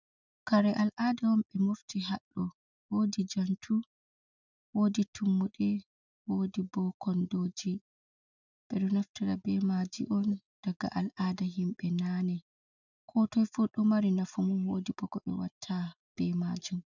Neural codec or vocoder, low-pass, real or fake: none; 7.2 kHz; real